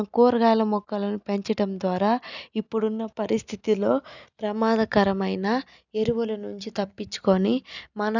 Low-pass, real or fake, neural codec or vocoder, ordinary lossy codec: 7.2 kHz; real; none; none